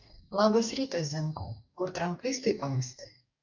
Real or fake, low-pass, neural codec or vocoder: fake; 7.2 kHz; codec, 44.1 kHz, 2.6 kbps, DAC